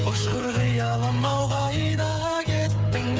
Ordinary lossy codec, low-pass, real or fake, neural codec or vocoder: none; none; fake; codec, 16 kHz, 8 kbps, FreqCodec, smaller model